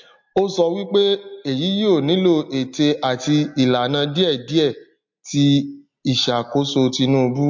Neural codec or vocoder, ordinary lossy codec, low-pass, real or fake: none; MP3, 48 kbps; 7.2 kHz; real